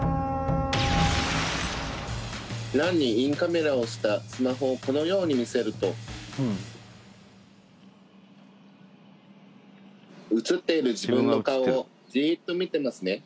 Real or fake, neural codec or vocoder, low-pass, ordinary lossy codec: real; none; none; none